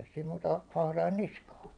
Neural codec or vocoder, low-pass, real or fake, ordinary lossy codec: vocoder, 22.05 kHz, 80 mel bands, WaveNeXt; 9.9 kHz; fake; none